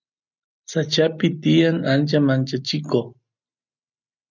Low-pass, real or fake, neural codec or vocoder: 7.2 kHz; real; none